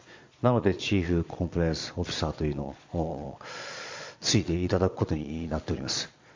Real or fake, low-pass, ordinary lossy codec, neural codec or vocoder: fake; 7.2 kHz; MP3, 48 kbps; vocoder, 22.05 kHz, 80 mel bands, Vocos